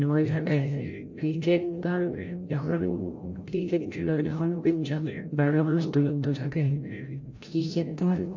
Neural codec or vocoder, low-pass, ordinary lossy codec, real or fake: codec, 16 kHz, 0.5 kbps, FreqCodec, larger model; 7.2 kHz; MP3, 48 kbps; fake